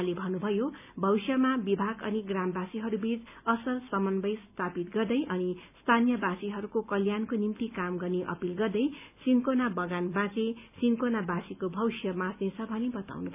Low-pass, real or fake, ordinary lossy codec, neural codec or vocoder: 3.6 kHz; real; none; none